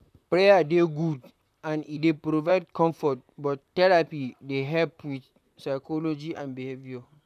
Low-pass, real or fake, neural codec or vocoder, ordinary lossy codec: 14.4 kHz; fake; vocoder, 44.1 kHz, 128 mel bands, Pupu-Vocoder; none